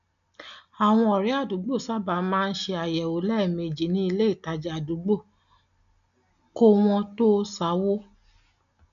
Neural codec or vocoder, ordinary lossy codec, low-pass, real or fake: none; none; 7.2 kHz; real